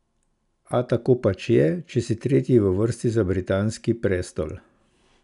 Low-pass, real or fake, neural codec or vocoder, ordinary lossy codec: 10.8 kHz; real; none; none